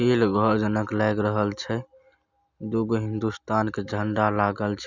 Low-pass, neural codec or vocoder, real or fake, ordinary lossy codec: 7.2 kHz; none; real; none